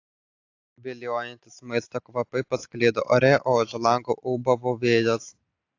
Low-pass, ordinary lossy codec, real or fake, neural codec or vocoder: 7.2 kHz; AAC, 48 kbps; real; none